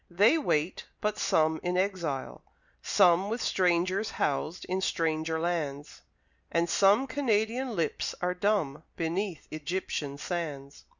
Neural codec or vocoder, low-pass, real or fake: none; 7.2 kHz; real